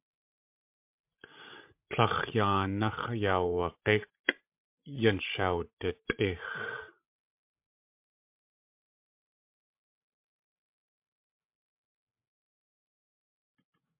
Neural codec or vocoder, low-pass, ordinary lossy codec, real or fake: codec, 16 kHz, 16 kbps, FreqCodec, larger model; 3.6 kHz; MP3, 32 kbps; fake